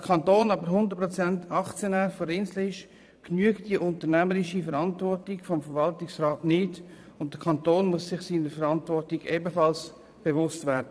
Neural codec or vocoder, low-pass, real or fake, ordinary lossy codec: vocoder, 22.05 kHz, 80 mel bands, Vocos; none; fake; none